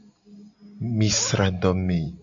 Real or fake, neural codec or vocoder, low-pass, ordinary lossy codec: real; none; 7.2 kHz; AAC, 64 kbps